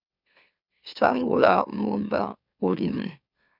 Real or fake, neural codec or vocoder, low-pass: fake; autoencoder, 44.1 kHz, a latent of 192 numbers a frame, MeloTTS; 5.4 kHz